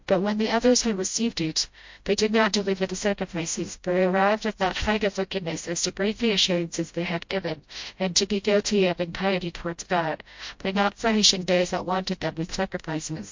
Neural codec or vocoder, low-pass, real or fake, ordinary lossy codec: codec, 16 kHz, 0.5 kbps, FreqCodec, smaller model; 7.2 kHz; fake; MP3, 48 kbps